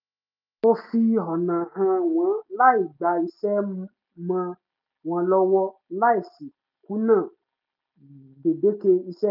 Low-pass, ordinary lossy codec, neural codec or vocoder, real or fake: 5.4 kHz; none; none; real